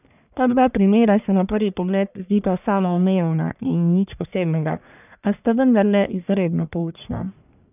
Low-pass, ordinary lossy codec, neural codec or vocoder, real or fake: 3.6 kHz; none; codec, 44.1 kHz, 1.7 kbps, Pupu-Codec; fake